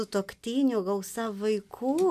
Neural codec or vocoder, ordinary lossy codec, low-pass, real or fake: none; MP3, 96 kbps; 14.4 kHz; real